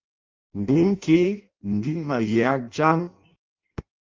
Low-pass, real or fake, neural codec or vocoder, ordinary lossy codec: 7.2 kHz; fake; codec, 16 kHz in and 24 kHz out, 0.6 kbps, FireRedTTS-2 codec; Opus, 32 kbps